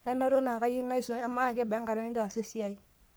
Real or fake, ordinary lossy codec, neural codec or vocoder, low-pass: fake; none; codec, 44.1 kHz, 3.4 kbps, Pupu-Codec; none